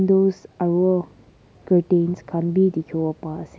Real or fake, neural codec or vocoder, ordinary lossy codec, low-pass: real; none; none; none